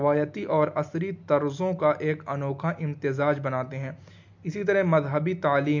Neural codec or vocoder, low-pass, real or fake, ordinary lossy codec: none; 7.2 kHz; real; MP3, 64 kbps